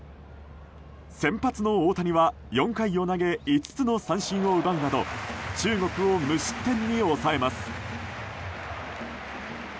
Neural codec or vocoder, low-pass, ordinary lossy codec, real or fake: none; none; none; real